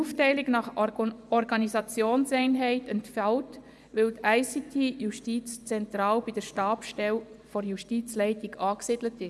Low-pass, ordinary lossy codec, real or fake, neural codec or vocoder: none; none; fake; vocoder, 24 kHz, 100 mel bands, Vocos